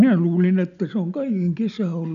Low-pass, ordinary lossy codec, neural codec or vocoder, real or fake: 7.2 kHz; none; none; real